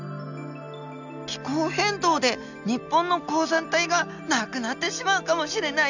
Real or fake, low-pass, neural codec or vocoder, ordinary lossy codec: real; 7.2 kHz; none; none